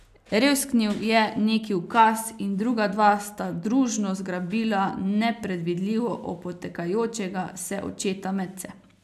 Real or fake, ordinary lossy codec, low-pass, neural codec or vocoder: real; none; 14.4 kHz; none